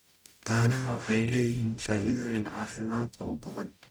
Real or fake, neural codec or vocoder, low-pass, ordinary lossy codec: fake; codec, 44.1 kHz, 0.9 kbps, DAC; none; none